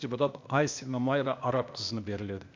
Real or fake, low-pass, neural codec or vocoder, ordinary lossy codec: fake; 7.2 kHz; codec, 16 kHz, 0.8 kbps, ZipCodec; MP3, 64 kbps